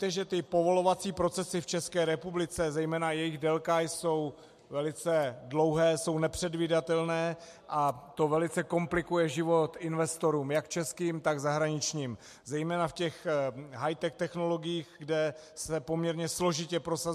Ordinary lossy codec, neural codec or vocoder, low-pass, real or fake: MP3, 64 kbps; none; 14.4 kHz; real